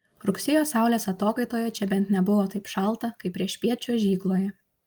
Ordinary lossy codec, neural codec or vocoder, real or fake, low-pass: Opus, 32 kbps; none; real; 19.8 kHz